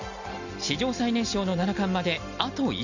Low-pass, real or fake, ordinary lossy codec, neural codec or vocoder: 7.2 kHz; real; none; none